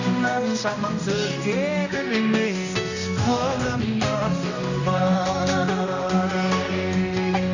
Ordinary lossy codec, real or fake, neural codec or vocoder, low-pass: none; fake; codec, 16 kHz, 1 kbps, X-Codec, HuBERT features, trained on general audio; 7.2 kHz